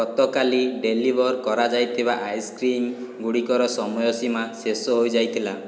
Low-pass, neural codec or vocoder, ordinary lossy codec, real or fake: none; none; none; real